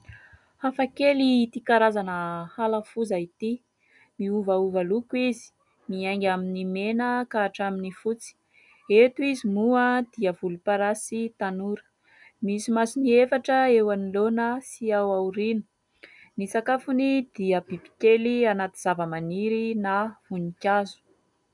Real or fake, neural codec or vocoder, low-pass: real; none; 10.8 kHz